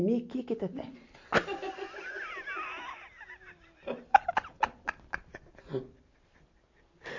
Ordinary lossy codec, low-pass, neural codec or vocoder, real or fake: MP3, 48 kbps; 7.2 kHz; none; real